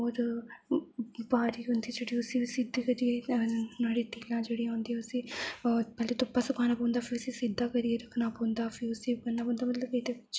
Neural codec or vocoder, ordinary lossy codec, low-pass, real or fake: none; none; none; real